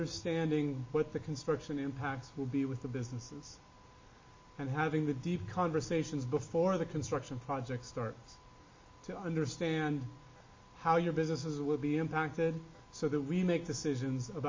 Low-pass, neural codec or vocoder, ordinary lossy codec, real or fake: 7.2 kHz; none; MP3, 32 kbps; real